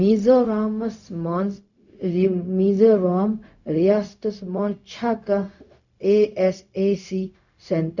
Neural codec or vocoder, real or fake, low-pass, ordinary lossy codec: codec, 16 kHz, 0.4 kbps, LongCat-Audio-Codec; fake; 7.2 kHz; none